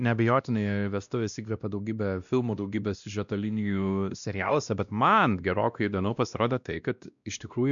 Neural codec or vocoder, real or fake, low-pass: codec, 16 kHz, 1 kbps, X-Codec, WavLM features, trained on Multilingual LibriSpeech; fake; 7.2 kHz